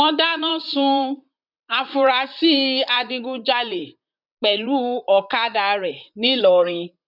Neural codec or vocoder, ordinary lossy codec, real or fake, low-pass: vocoder, 44.1 kHz, 128 mel bands, Pupu-Vocoder; none; fake; 5.4 kHz